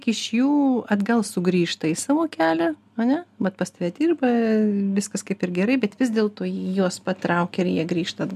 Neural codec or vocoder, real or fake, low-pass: none; real; 14.4 kHz